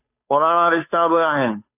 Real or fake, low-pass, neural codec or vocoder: fake; 3.6 kHz; codec, 16 kHz, 2 kbps, FunCodec, trained on Chinese and English, 25 frames a second